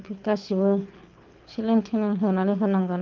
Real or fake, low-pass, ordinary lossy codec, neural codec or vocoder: fake; 7.2 kHz; Opus, 32 kbps; codec, 24 kHz, 6 kbps, HILCodec